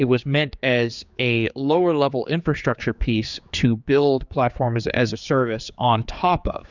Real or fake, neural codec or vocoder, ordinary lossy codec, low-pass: fake; codec, 16 kHz, 4 kbps, X-Codec, HuBERT features, trained on general audio; Opus, 64 kbps; 7.2 kHz